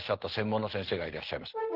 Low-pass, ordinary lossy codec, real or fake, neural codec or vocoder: 5.4 kHz; Opus, 16 kbps; real; none